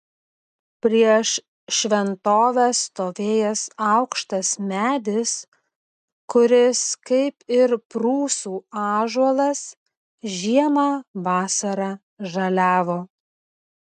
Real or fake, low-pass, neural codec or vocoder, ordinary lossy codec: real; 10.8 kHz; none; MP3, 96 kbps